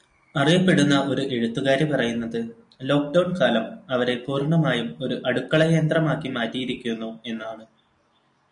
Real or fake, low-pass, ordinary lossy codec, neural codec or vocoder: real; 9.9 kHz; MP3, 64 kbps; none